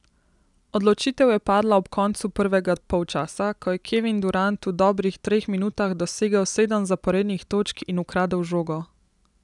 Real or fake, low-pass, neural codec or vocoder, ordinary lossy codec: real; 10.8 kHz; none; none